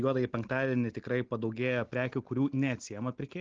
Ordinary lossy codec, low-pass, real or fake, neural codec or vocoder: Opus, 16 kbps; 7.2 kHz; real; none